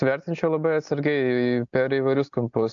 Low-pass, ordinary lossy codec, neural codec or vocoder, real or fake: 7.2 kHz; AAC, 64 kbps; none; real